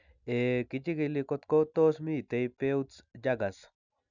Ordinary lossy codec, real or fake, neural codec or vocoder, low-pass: none; real; none; 7.2 kHz